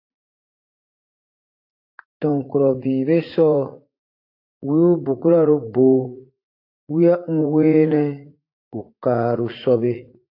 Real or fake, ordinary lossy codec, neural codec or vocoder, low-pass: fake; AAC, 32 kbps; vocoder, 44.1 kHz, 80 mel bands, Vocos; 5.4 kHz